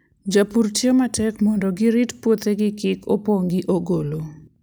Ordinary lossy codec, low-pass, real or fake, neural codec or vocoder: none; none; real; none